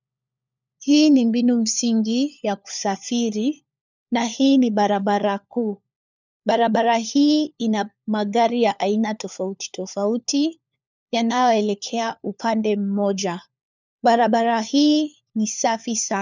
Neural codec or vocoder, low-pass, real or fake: codec, 16 kHz, 4 kbps, FunCodec, trained on LibriTTS, 50 frames a second; 7.2 kHz; fake